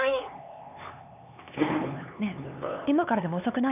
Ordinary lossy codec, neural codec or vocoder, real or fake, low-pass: none; codec, 16 kHz, 4 kbps, X-Codec, HuBERT features, trained on LibriSpeech; fake; 3.6 kHz